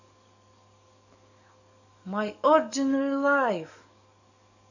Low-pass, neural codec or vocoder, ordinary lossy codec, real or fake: 7.2 kHz; codec, 44.1 kHz, 7.8 kbps, DAC; none; fake